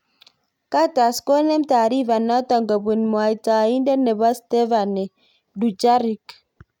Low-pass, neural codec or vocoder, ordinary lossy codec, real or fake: 19.8 kHz; none; none; real